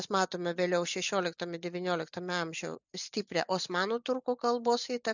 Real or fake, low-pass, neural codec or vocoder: real; 7.2 kHz; none